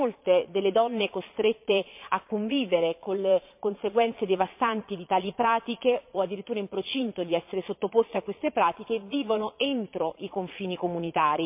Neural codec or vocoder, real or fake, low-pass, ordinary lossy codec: vocoder, 22.05 kHz, 80 mel bands, Vocos; fake; 3.6 kHz; MP3, 32 kbps